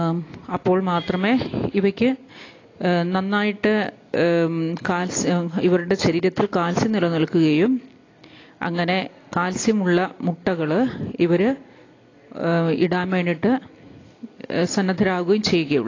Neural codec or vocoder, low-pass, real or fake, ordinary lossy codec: none; 7.2 kHz; real; AAC, 32 kbps